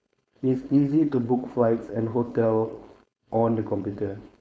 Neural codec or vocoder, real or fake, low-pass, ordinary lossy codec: codec, 16 kHz, 4.8 kbps, FACodec; fake; none; none